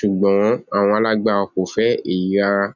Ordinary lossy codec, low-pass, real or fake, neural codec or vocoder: none; 7.2 kHz; real; none